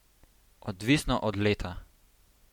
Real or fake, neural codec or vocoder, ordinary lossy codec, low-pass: real; none; MP3, 96 kbps; 19.8 kHz